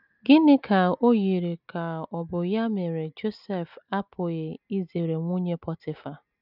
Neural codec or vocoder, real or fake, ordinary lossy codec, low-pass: none; real; none; 5.4 kHz